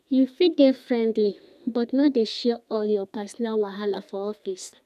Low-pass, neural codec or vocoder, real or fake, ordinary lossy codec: 14.4 kHz; codec, 32 kHz, 1.9 kbps, SNAC; fake; none